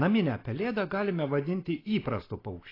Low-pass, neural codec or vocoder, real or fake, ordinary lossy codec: 5.4 kHz; none; real; AAC, 24 kbps